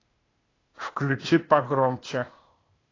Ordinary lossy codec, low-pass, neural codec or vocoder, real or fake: AAC, 32 kbps; 7.2 kHz; codec, 16 kHz, 0.8 kbps, ZipCodec; fake